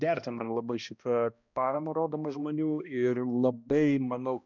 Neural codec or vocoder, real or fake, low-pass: codec, 16 kHz, 1 kbps, X-Codec, HuBERT features, trained on balanced general audio; fake; 7.2 kHz